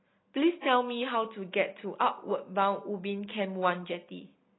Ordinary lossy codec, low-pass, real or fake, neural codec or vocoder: AAC, 16 kbps; 7.2 kHz; real; none